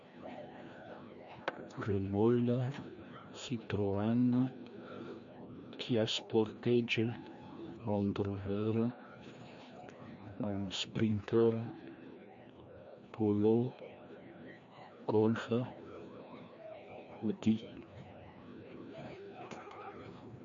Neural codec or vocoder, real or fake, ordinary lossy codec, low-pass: codec, 16 kHz, 1 kbps, FreqCodec, larger model; fake; MP3, 48 kbps; 7.2 kHz